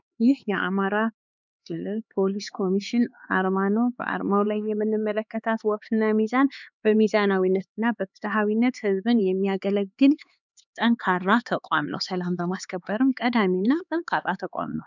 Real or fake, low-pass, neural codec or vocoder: fake; 7.2 kHz; codec, 16 kHz, 4 kbps, X-Codec, HuBERT features, trained on LibriSpeech